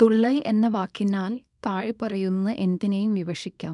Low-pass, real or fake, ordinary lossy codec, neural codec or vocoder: 10.8 kHz; fake; none; codec, 24 kHz, 0.9 kbps, WavTokenizer, small release